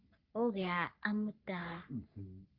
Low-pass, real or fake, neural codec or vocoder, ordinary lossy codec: 5.4 kHz; fake; codec, 44.1 kHz, 3.4 kbps, Pupu-Codec; Opus, 32 kbps